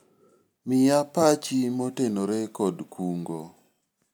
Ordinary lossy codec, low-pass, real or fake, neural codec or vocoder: none; none; real; none